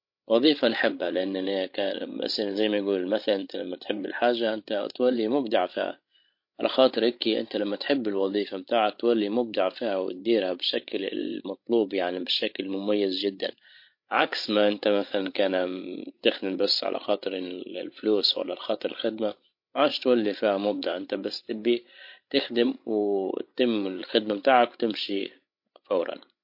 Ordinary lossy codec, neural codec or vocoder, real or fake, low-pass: MP3, 32 kbps; codec, 16 kHz, 16 kbps, FreqCodec, larger model; fake; 5.4 kHz